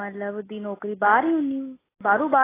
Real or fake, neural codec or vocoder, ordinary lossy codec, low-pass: real; none; AAC, 16 kbps; 3.6 kHz